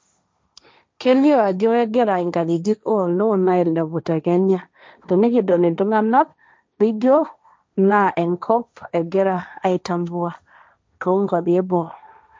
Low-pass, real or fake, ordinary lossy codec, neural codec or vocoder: none; fake; none; codec, 16 kHz, 1.1 kbps, Voila-Tokenizer